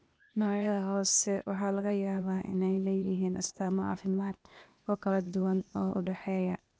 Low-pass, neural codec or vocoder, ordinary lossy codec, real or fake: none; codec, 16 kHz, 0.8 kbps, ZipCodec; none; fake